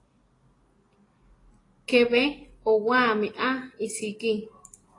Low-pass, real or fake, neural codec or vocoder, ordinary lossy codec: 10.8 kHz; real; none; AAC, 32 kbps